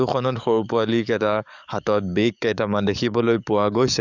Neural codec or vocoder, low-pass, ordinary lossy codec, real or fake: codec, 44.1 kHz, 7.8 kbps, DAC; 7.2 kHz; none; fake